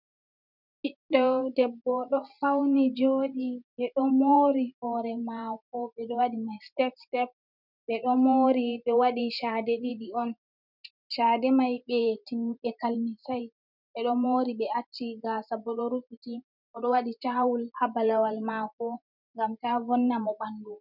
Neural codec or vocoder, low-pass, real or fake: vocoder, 44.1 kHz, 128 mel bands every 512 samples, BigVGAN v2; 5.4 kHz; fake